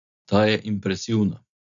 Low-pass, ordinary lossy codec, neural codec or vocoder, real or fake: 7.2 kHz; none; none; real